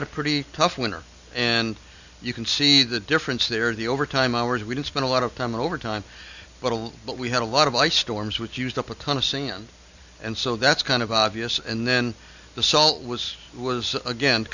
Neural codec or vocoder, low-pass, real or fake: none; 7.2 kHz; real